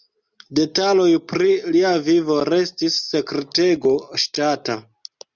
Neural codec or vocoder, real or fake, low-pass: none; real; 7.2 kHz